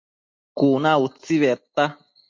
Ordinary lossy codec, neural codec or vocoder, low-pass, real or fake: MP3, 48 kbps; none; 7.2 kHz; real